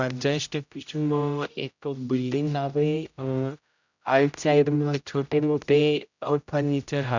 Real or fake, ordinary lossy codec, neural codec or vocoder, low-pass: fake; none; codec, 16 kHz, 0.5 kbps, X-Codec, HuBERT features, trained on general audio; 7.2 kHz